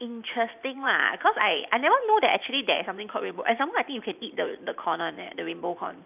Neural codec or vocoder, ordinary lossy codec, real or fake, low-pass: vocoder, 44.1 kHz, 128 mel bands every 512 samples, BigVGAN v2; none; fake; 3.6 kHz